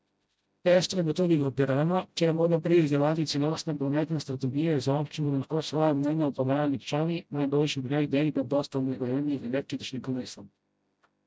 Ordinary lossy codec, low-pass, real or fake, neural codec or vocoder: none; none; fake; codec, 16 kHz, 0.5 kbps, FreqCodec, smaller model